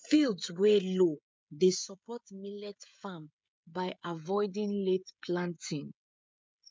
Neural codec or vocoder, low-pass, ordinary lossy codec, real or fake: codec, 16 kHz, 16 kbps, FreqCodec, smaller model; none; none; fake